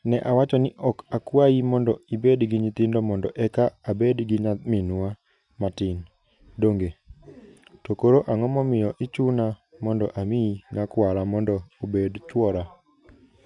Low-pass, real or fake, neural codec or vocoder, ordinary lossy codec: 10.8 kHz; real; none; none